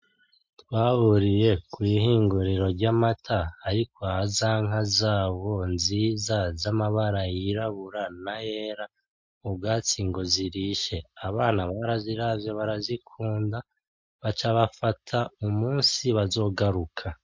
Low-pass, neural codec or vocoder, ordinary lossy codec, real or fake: 7.2 kHz; none; MP3, 48 kbps; real